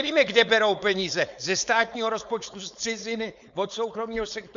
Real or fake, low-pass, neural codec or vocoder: fake; 7.2 kHz; codec, 16 kHz, 4.8 kbps, FACodec